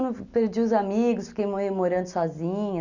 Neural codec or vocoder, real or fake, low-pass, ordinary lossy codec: none; real; 7.2 kHz; none